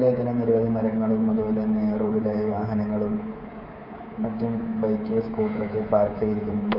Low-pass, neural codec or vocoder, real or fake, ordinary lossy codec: 5.4 kHz; codec, 24 kHz, 3.1 kbps, DualCodec; fake; none